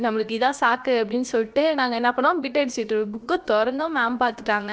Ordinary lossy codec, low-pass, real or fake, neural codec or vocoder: none; none; fake; codec, 16 kHz, about 1 kbps, DyCAST, with the encoder's durations